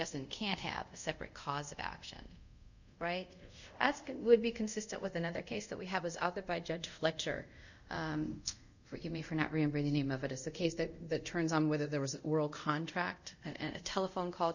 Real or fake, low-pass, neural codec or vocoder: fake; 7.2 kHz; codec, 24 kHz, 0.5 kbps, DualCodec